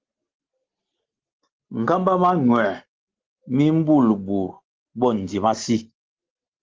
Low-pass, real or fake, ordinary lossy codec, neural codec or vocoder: 7.2 kHz; real; Opus, 16 kbps; none